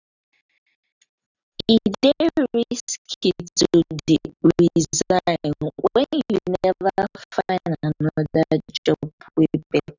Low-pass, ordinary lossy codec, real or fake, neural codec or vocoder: 7.2 kHz; none; real; none